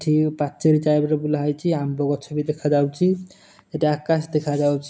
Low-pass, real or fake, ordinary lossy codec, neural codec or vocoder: none; real; none; none